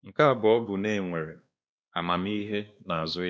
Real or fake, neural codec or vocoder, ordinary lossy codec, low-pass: fake; codec, 16 kHz, 2 kbps, X-Codec, WavLM features, trained on Multilingual LibriSpeech; none; none